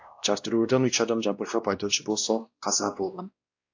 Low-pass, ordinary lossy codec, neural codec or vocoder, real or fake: 7.2 kHz; AAC, 48 kbps; codec, 16 kHz, 1 kbps, X-Codec, WavLM features, trained on Multilingual LibriSpeech; fake